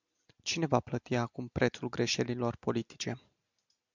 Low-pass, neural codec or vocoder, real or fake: 7.2 kHz; none; real